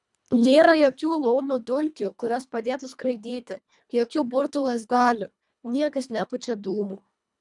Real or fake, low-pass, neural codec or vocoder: fake; 10.8 kHz; codec, 24 kHz, 1.5 kbps, HILCodec